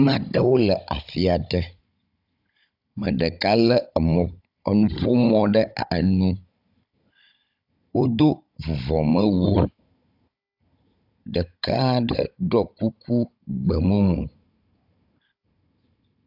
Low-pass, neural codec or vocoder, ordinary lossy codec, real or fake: 5.4 kHz; codec, 16 kHz, 16 kbps, FunCodec, trained on Chinese and English, 50 frames a second; AAC, 48 kbps; fake